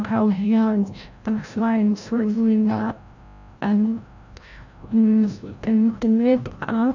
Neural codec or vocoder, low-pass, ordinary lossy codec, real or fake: codec, 16 kHz, 0.5 kbps, FreqCodec, larger model; 7.2 kHz; none; fake